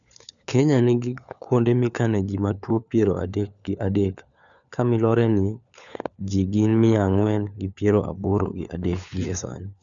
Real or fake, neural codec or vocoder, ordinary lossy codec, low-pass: fake; codec, 16 kHz, 4 kbps, FunCodec, trained on LibriTTS, 50 frames a second; none; 7.2 kHz